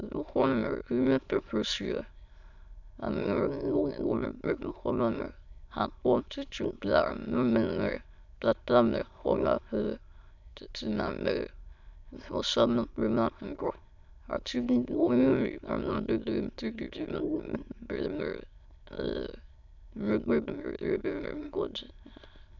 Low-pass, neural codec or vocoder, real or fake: 7.2 kHz; autoencoder, 22.05 kHz, a latent of 192 numbers a frame, VITS, trained on many speakers; fake